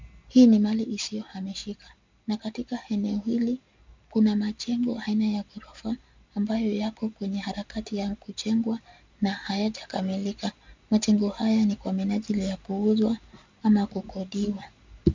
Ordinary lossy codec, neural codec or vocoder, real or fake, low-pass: MP3, 64 kbps; none; real; 7.2 kHz